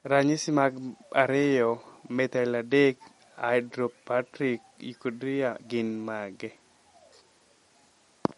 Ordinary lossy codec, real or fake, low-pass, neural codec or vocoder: MP3, 48 kbps; real; 19.8 kHz; none